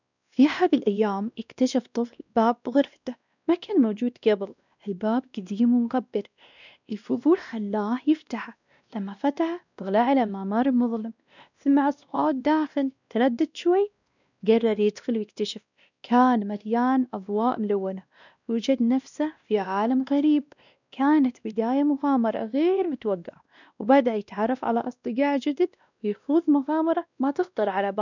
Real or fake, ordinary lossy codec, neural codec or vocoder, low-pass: fake; none; codec, 16 kHz, 1 kbps, X-Codec, WavLM features, trained on Multilingual LibriSpeech; 7.2 kHz